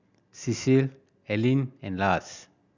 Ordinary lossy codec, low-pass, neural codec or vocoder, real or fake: none; 7.2 kHz; none; real